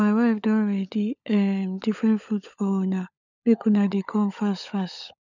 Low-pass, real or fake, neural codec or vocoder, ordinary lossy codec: 7.2 kHz; fake; codec, 16 kHz, 16 kbps, FunCodec, trained on LibriTTS, 50 frames a second; none